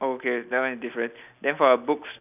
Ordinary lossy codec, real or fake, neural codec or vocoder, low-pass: none; real; none; 3.6 kHz